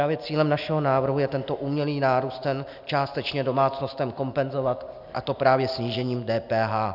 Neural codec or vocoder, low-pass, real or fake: none; 5.4 kHz; real